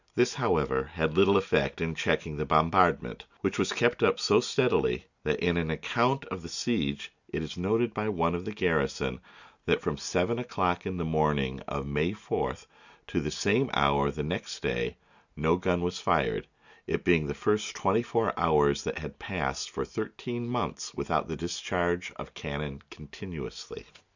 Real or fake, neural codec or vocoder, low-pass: real; none; 7.2 kHz